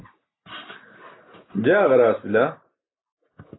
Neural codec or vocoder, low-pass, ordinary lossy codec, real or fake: none; 7.2 kHz; AAC, 16 kbps; real